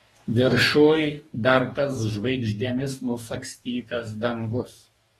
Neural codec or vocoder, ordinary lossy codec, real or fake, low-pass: codec, 44.1 kHz, 2.6 kbps, DAC; AAC, 32 kbps; fake; 19.8 kHz